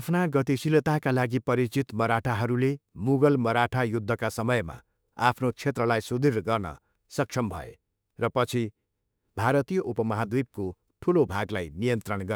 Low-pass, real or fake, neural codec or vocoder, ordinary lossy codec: none; fake; autoencoder, 48 kHz, 32 numbers a frame, DAC-VAE, trained on Japanese speech; none